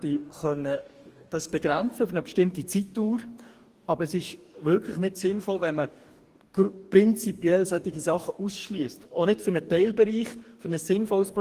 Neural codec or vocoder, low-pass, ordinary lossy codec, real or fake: codec, 44.1 kHz, 2.6 kbps, DAC; 14.4 kHz; Opus, 24 kbps; fake